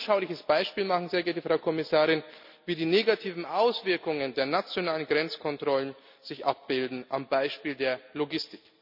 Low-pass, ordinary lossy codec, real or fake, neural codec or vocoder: 5.4 kHz; none; real; none